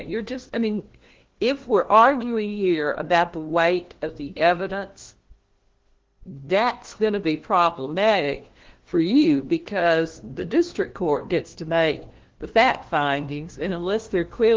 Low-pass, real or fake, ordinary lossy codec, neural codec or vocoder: 7.2 kHz; fake; Opus, 16 kbps; codec, 16 kHz, 1 kbps, FunCodec, trained on LibriTTS, 50 frames a second